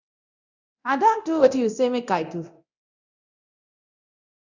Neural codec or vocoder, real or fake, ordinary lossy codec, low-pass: codec, 24 kHz, 0.9 kbps, DualCodec; fake; Opus, 64 kbps; 7.2 kHz